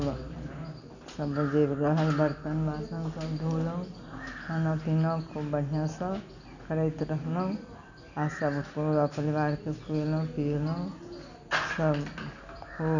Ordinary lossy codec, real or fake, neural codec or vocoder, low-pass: none; real; none; 7.2 kHz